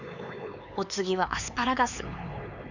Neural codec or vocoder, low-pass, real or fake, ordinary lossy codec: codec, 16 kHz, 4 kbps, X-Codec, HuBERT features, trained on LibriSpeech; 7.2 kHz; fake; none